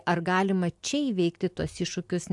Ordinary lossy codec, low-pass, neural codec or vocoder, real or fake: AAC, 64 kbps; 10.8 kHz; none; real